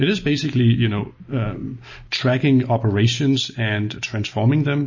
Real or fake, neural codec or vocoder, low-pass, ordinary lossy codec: real; none; 7.2 kHz; MP3, 32 kbps